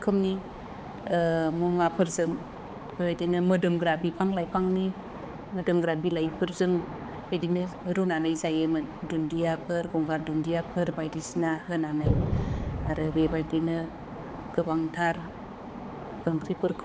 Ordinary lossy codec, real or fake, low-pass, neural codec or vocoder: none; fake; none; codec, 16 kHz, 4 kbps, X-Codec, HuBERT features, trained on balanced general audio